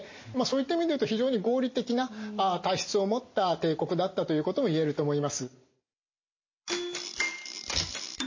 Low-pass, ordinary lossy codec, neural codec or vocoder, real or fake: 7.2 kHz; MP3, 32 kbps; none; real